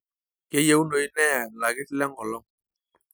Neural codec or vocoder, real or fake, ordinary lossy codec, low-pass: none; real; none; none